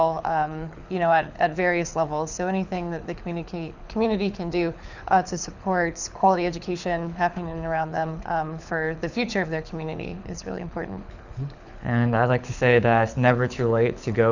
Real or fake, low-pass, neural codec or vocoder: fake; 7.2 kHz; codec, 24 kHz, 6 kbps, HILCodec